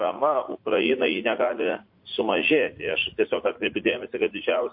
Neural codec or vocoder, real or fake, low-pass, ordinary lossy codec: vocoder, 44.1 kHz, 80 mel bands, Vocos; fake; 5.4 kHz; MP3, 32 kbps